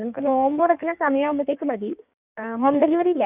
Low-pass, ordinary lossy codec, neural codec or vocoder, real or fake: 3.6 kHz; none; codec, 16 kHz in and 24 kHz out, 1.1 kbps, FireRedTTS-2 codec; fake